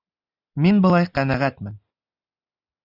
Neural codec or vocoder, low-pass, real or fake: none; 5.4 kHz; real